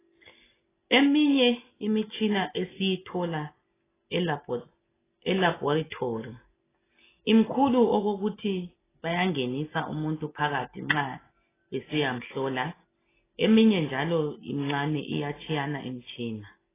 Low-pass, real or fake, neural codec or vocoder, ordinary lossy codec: 3.6 kHz; real; none; AAC, 16 kbps